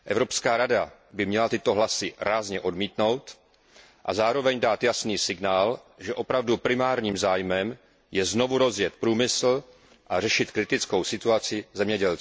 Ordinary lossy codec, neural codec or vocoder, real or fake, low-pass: none; none; real; none